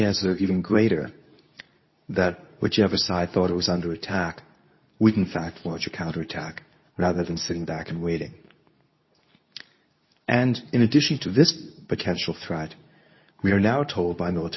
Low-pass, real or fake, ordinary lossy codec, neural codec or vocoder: 7.2 kHz; fake; MP3, 24 kbps; codec, 24 kHz, 0.9 kbps, WavTokenizer, medium speech release version 2